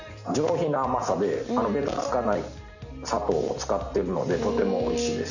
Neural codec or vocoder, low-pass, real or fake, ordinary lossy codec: none; 7.2 kHz; real; none